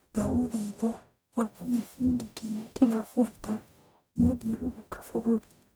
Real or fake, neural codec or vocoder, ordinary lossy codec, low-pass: fake; codec, 44.1 kHz, 0.9 kbps, DAC; none; none